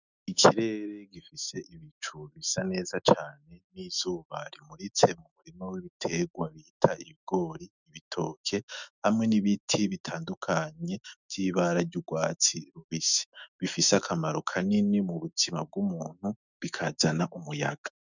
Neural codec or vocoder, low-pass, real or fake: autoencoder, 48 kHz, 128 numbers a frame, DAC-VAE, trained on Japanese speech; 7.2 kHz; fake